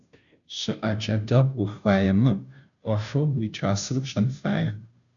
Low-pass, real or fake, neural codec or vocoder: 7.2 kHz; fake; codec, 16 kHz, 0.5 kbps, FunCodec, trained on Chinese and English, 25 frames a second